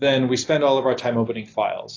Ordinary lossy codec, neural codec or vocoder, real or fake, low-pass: AAC, 32 kbps; none; real; 7.2 kHz